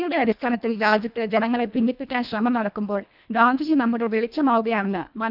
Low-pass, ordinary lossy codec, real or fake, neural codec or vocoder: 5.4 kHz; none; fake; codec, 24 kHz, 1.5 kbps, HILCodec